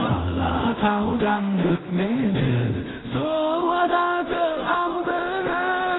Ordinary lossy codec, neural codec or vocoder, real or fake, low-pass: AAC, 16 kbps; codec, 16 kHz in and 24 kHz out, 0.4 kbps, LongCat-Audio-Codec, two codebook decoder; fake; 7.2 kHz